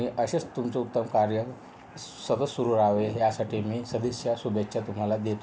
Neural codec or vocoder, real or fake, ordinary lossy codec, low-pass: none; real; none; none